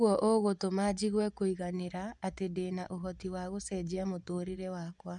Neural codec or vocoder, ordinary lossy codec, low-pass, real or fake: vocoder, 24 kHz, 100 mel bands, Vocos; none; 10.8 kHz; fake